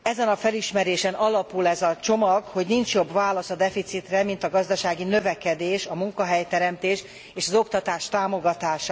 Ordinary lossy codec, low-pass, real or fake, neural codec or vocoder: none; none; real; none